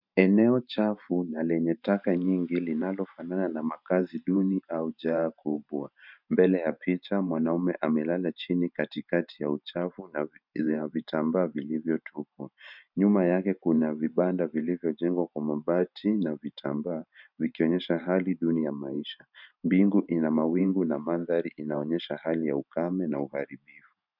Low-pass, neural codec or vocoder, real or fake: 5.4 kHz; vocoder, 44.1 kHz, 128 mel bands every 512 samples, BigVGAN v2; fake